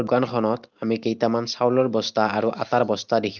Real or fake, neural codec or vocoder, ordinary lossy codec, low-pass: real; none; Opus, 24 kbps; 7.2 kHz